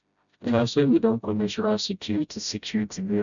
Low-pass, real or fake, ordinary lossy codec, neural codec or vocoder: 7.2 kHz; fake; none; codec, 16 kHz, 0.5 kbps, FreqCodec, smaller model